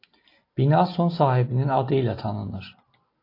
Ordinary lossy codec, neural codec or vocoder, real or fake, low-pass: AAC, 32 kbps; none; real; 5.4 kHz